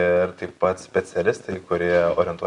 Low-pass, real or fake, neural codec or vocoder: 10.8 kHz; real; none